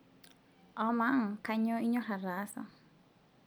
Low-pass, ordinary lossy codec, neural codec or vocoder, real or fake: none; none; none; real